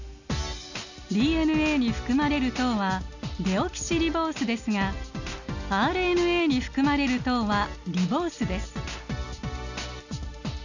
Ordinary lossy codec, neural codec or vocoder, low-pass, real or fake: none; none; 7.2 kHz; real